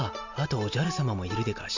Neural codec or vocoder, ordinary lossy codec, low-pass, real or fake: none; none; 7.2 kHz; real